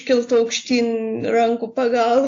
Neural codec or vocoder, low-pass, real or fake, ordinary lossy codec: none; 7.2 kHz; real; AAC, 48 kbps